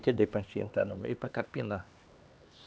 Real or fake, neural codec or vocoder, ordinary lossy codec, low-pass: fake; codec, 16 kHz, 2 kbps, X-Codec, HuBERT features, trained on LibriSpeech; none; none